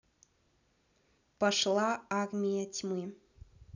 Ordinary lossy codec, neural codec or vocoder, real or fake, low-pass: none; none; real; 7.2 kHz